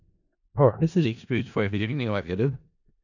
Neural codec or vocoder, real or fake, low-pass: codec, 16 kHz in and 24 kHz out, 0.4 kbps, LongCat-Audio-Codec, four codebook decoder; fake; 7.2 kHz